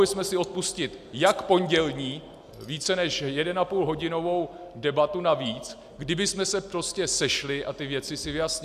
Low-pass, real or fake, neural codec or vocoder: 14.4 kHz; real; none